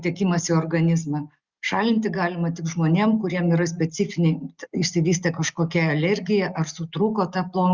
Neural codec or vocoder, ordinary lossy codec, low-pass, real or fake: none; Opus, 64 kbps; 7.2 kHz; real